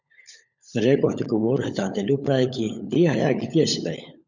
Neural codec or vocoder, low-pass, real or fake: codec, 16 kHz, 16 kbps, FunCodec, trained on LibriTTS, 50 frames a second; 7.2 kHz; fake